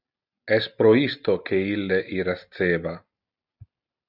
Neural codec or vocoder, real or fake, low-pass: none; real; 5.4 kHz